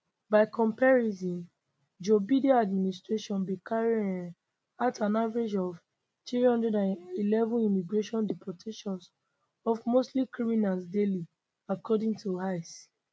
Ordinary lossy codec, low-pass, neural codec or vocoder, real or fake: none; none; none; real